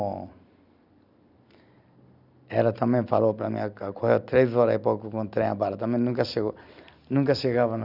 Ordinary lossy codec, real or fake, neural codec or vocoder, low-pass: none; real; none; 5.4 kHz